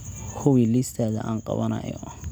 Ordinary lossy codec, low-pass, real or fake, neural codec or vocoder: none; none; real; none